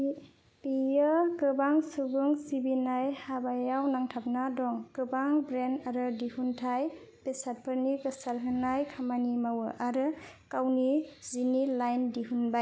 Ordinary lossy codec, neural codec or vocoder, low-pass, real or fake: none; none; none; real